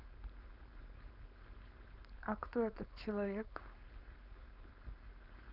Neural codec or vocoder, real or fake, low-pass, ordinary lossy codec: codec, 16 kHz, 4.8 kbps, FACodec; fake; 5.4 kHz; none